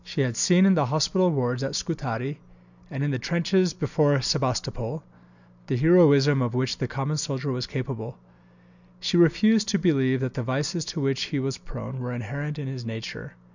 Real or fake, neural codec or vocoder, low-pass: real; none; 7.2 kHz